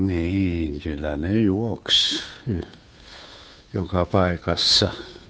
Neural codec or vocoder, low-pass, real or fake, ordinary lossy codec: codec, 16 kHz, 2 kbps, FunCodec, trained on Chinese and English, 25 frames a second; none; fake; none